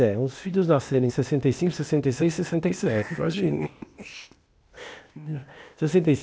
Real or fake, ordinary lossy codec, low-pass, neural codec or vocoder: fake; none; none; codec, 16 kHz, 0.8 kbps, ZipCodec